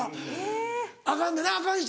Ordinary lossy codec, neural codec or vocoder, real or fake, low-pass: none; none; real; none